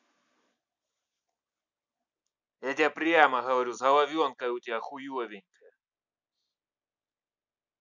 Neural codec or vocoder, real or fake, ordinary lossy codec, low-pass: none; real; none; 7.2 kHz